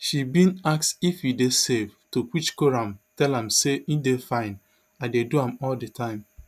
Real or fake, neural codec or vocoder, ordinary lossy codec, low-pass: real; none; none; 14.4 kHz